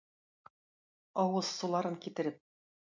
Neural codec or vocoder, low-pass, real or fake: none; 7.2 kHz; real